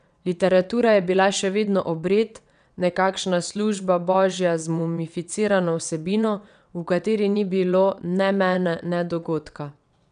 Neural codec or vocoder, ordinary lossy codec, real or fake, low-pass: vocoder, 22.05 kHz, 80 mel bands, WaveNeXt; none; fake; 9.9 kHz